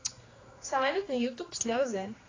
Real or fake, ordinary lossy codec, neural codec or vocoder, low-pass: fake; AAC, 32 kbps; codec, 16 kHz, 2 kbps, X-Codec, HuBERT features, trained on general audio; 7.2 kHz